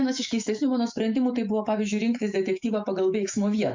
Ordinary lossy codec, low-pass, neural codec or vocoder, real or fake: MP3, 64 kbps; 7.2 kHz; autoencoder, 48 kHz, 128 numbers a frame, DAC-VAE, trained on Japanese speech; fake